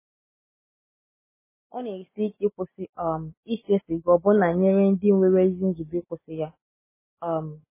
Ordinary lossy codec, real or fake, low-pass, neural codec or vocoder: MP3, 16 kbps; real; 3.6 kHz; none